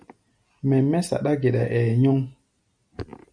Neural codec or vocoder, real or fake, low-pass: none; real; 9.9 kHz